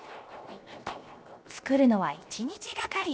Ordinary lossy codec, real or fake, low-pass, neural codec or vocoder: none; fake; none; codec, 16 kHz, 0.7 kbps, FocalCodec